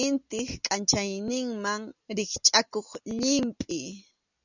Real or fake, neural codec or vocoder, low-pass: real; none; 7.2 kHz